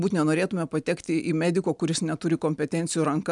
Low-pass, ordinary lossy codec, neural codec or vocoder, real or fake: 10.8 kHz; MP3, 96 kbps; none; real